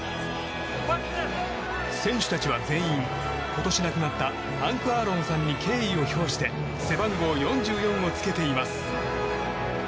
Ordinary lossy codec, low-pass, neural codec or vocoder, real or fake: none; none; none; real